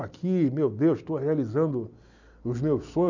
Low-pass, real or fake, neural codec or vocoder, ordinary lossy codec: 7.2 kHz; real; none; none